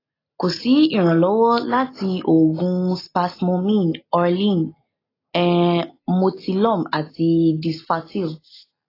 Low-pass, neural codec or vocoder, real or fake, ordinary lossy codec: 5.4 kHz; none; real; AAC, 24 kbps